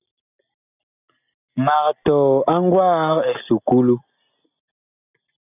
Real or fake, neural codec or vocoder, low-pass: real; none; 3.6 kHz